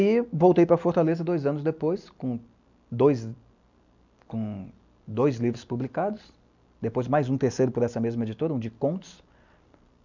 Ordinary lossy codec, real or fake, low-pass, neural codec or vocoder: none; real; 7.2 kHz; none